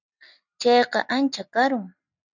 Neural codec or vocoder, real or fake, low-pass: none; real; 7.2 kHz